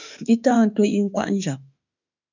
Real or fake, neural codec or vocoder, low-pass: fake; autoencoder, 48 kHz, 32 numbers a frame, DAC-VAE, trained on Japanese speech; 7.2 kHz